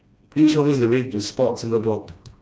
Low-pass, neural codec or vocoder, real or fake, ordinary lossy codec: none; codec, 16 kHz, 1 kbps, FreqCodec, smaller model; fake; none